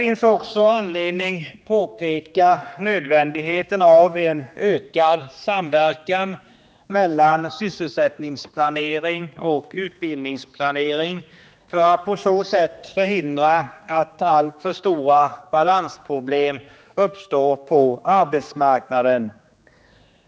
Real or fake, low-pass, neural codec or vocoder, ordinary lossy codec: fake; none; codec, 16 kHz, 2 kbps, X-Codec, HuBERT features, trained on general audio; none